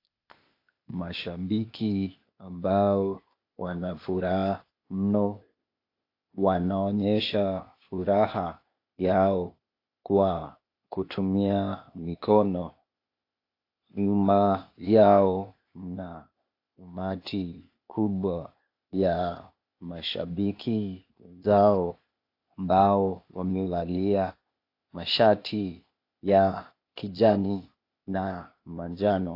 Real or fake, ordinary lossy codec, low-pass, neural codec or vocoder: fake; AAC, 32 kbps; 5.4 kHz; codec, 16 kHz, 0.8 kbps, ZipCodec